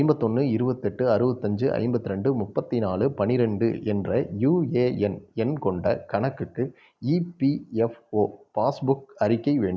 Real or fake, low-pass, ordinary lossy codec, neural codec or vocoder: real; none; none; none